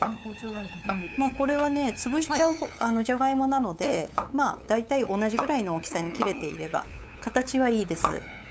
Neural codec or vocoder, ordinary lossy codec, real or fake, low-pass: codec, 16 kHz, 8 kbps, FunCodec, trained on LibriTTS, 25 frames a second; none; fake; none